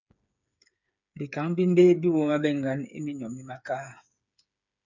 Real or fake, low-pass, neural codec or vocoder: fake; 7.2 kHz; codec, 16 kHz, 8 kbps, FreqCodec, smaller model